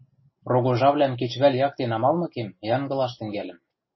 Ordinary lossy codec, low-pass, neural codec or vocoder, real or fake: MP3, 24 kbps; 7.2 kHz; none; real